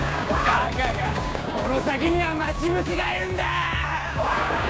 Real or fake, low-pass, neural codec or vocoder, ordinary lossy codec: fake; none; codec, 16 kHz, 6 kbps, DAC; none